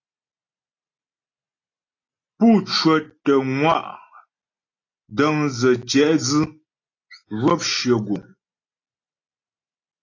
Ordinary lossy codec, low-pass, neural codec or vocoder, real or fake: AAC, 32 kbps; 7.2 kHz; none; real